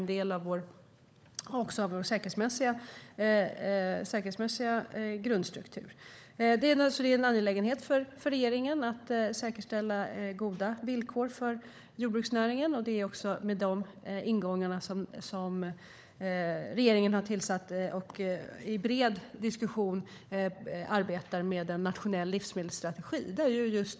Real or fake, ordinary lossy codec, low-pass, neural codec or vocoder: fake; none; none; codec, 16 kHz, 16 kbps, FunCodec, trained on LibriTTS, 50 frames a second